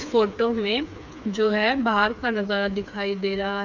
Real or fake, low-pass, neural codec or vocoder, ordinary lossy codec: fake; 7.2 kHz; codec, 16 kHz, 4 kbps, FreqCodec, smaller model; none